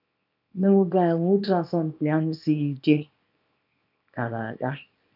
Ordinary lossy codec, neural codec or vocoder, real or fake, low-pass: none; codec, 24 kHz, 0.9 kbps, WavTokenizer, small release; fake; 5.4 kHz